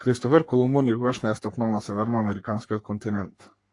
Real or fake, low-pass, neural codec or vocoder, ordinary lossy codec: fake; 10.8 kHz; codec, 44.1 kHz, 3.4 kbps, Pupu-Codec; AAC, 48 kbps